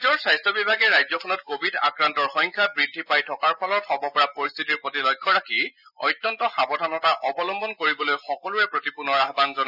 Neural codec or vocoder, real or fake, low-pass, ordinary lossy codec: none; real; 5.4 kHz; none